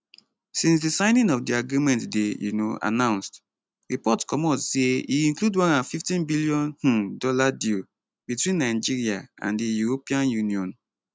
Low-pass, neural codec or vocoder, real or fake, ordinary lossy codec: none; none; real; none